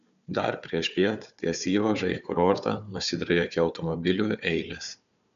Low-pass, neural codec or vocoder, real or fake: 7.2 kHz; codec, 16 kHz, 4 kbps, FunCodec, trained on Chinese and English, 50 frames a second; fake